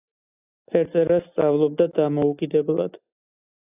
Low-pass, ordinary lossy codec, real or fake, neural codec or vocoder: 3.6 kHz; AAC, 32 kbps; real; none